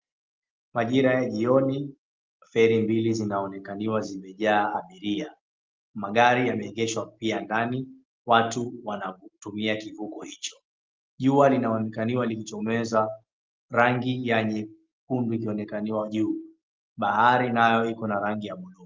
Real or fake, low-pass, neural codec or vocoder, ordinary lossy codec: real; 7.2 kHz; none; Opus, 24 kbps